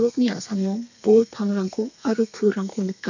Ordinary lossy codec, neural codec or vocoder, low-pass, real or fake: none; codec, 32 kHz, 1.9 kbps, SNAC; 7.2 kHz; fake